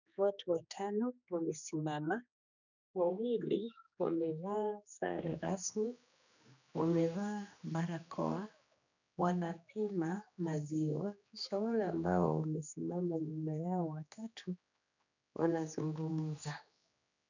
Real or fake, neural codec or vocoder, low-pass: fake; codec, 16 kHz, 2 kbps, X-Codec, HuBERT features, trained on general audio; 7.2 kHz